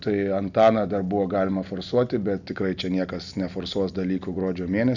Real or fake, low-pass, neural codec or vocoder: real; 7.2 kHz; none